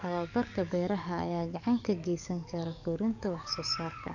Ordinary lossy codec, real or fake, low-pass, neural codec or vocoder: none; fake; 7.2 kHz; codec, 44.1 kHz, 7.8 kbps, DAC